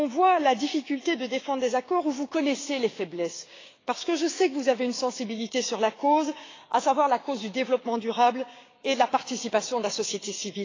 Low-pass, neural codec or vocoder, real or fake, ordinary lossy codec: 7.2 kHz; codec, 16 kHz, 6 kbps, DAC; fake; AAC, 32 kbps